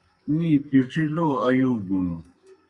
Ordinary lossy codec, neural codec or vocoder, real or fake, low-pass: Opus, 64 kbps; codec, 32 kHz, 1.9 kbps, SNAC; fake; 10.8 kHz